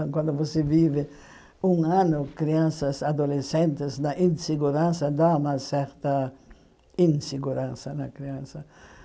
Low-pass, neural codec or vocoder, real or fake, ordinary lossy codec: none; none; real; none